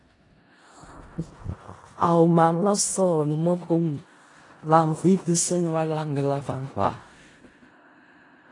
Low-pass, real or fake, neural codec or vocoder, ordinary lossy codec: 10.8 kHz; fake; codec, 16 kHz in and 24 kHz out, 0.4 kbps, LongCat-Audio-Codec, four codebook decoder; AAC, 32 kbps